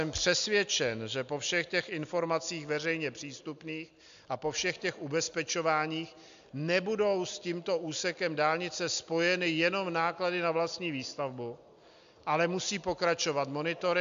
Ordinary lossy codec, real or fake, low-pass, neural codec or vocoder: MP3, 64 kbps; real; 7.2 kHz; none